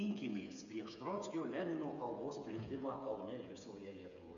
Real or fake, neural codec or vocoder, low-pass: fake; codec, 16 kHz, 8 kbps, FreqCodec, smaller model; 7.2 kHz